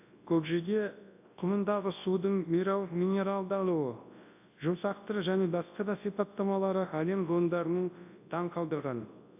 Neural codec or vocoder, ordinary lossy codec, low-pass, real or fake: codec, 24 kHz, 0.9 kbps, WavTokenizer, large speech release; AAC, 32 kbps; 3.6 kHz; fake